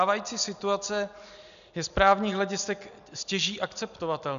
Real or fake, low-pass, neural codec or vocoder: real; 7.2 kHz; none